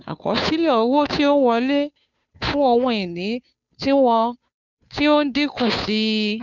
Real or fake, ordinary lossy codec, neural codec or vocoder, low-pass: fake; none; codec, 16 kHz, 2 kbps, FunCodec, trained on Chinese and English, 25 frames a second; 7.2 kHz